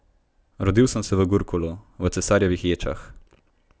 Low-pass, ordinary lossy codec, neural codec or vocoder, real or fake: none; none; none; real